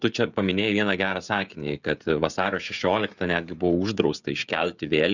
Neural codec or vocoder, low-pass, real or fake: codec, 16 kHz, 16 kbps, FreqCodec, smaller model; 7.2 kHz; fake